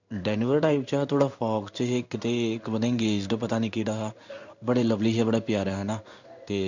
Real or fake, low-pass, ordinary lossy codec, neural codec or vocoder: fake; 7.2 kHz; none; codec, 16 kHz in and 24 kHz out, 1 kbps, XY-Tokenizer